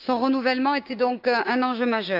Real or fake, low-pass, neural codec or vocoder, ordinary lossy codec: fake; 5.4 kHz; codec, 16 kHz, 6 kbps, DAC; AAC, 48 kbps